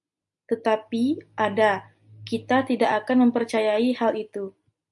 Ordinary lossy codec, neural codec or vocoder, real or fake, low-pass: MP3, 64 kbps; none; real; 10.8 kHz